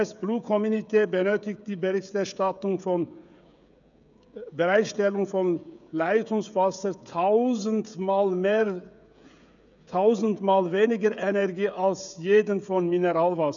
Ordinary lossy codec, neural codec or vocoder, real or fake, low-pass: none; codec, 16 kHz, 16 kbps, FreqCodec, smaller model; fake; 7.2 kHz